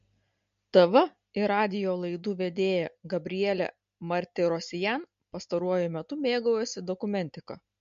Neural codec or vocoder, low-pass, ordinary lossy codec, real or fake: none; 7.2 kHz; MP3, 48 kbps; real